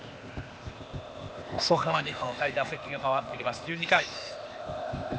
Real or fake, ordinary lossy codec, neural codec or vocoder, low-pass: fake; none; codec, 16 kHz, 0.8 kbps, ZipCodec; none